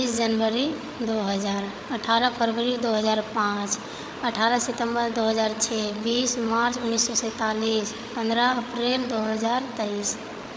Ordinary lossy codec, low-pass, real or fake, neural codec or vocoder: none; none; fake; codec, 16 kHz, 8 kbps, FreqCodec, larger model